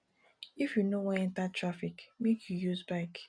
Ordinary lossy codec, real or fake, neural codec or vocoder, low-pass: none; real; none; 9.9 kHz